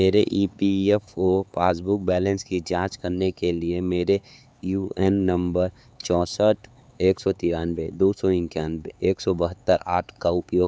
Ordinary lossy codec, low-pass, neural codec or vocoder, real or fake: none; none; codec, 16 kHz, 4 kbps, X-Codec, HuBERT features, trained on LibriSpeech; fake